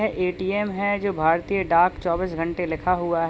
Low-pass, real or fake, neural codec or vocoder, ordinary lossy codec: none; real; none; none